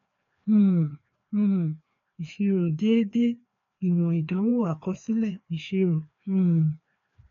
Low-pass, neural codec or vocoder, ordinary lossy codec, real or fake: 7.2 kHz; codec, 16 kHz, 2 kbps, FreqCodec, larger model; none; fake